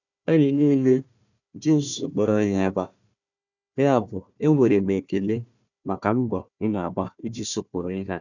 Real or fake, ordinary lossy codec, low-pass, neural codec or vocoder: fake; none; 7.2 kHz; codec, 16 kHz, 1 kbps, FunCodec, trained on Chinese and English, 50 frames a second